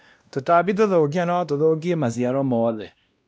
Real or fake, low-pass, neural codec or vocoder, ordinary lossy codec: fake; none; codec, 16 kHz, 1 kbps, X-Codec, WavLM features, trained on Multilingual LibriSpeech; none